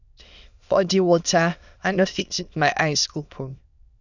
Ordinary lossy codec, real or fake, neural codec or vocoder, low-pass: none; fake; autoencoder, 22.05 kHz, a latent of 192 numbers a frame, VITS, trained on many speakers; 7.2 kHz